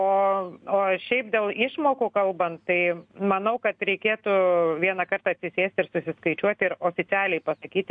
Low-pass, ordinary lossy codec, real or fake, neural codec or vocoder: 9.9 kHz; MP3, 64 kbps; real; none